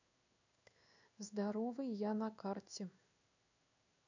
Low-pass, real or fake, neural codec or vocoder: 7.2 kHz; fake; codec, 16 kHz in and 24 kHz out, 1 kbps, XY-Tokenizer